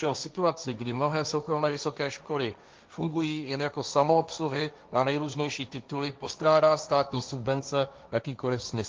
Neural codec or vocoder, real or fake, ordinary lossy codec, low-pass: codec, 16 kHz, 1.1 kbps, Voila-Tokenizer; fake; Opus, 24 kbps; 7.2 kHz